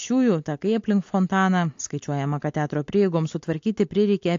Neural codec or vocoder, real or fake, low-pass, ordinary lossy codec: none; real; 7.2 kHz; AAC, 48 kbps